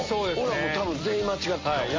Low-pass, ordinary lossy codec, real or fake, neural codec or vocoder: 7.2 kHz; MP3, 32 kbps; real; none